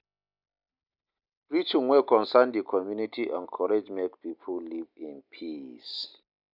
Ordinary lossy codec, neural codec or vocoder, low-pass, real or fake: none; none; 5.4 kHz; real